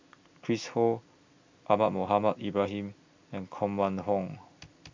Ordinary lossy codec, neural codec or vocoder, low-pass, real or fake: MP3, 64 kbps; none; 7.2 kHz; real